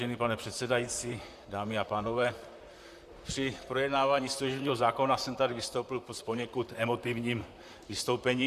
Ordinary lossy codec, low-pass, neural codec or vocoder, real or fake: Opus, 64 kbps; 14.4 kHz; vocoder, 44.1 kHz, 128 mel bands, Pupu-Vocoder; fake